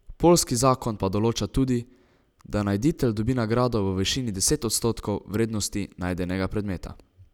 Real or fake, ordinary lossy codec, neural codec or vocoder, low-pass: real; none; none; 19.8 kHz